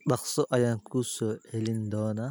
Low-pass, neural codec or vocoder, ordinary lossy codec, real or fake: none; none; none; real